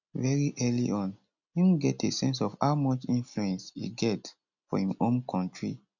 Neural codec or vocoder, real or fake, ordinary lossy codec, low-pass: none; real; none; 7.2 kHz